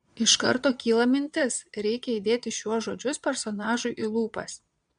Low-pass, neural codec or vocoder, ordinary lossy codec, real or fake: 9.9 kHz; vocoder, 22.05 kHz, 80 mel bands, Vocos; MP3, 64 kbps; fake